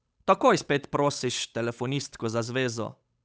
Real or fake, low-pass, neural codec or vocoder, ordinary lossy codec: fake; none; codec, 16 kHz, 8 kbps, FunCodec, trained on Chinese and English, 25 frames a second; none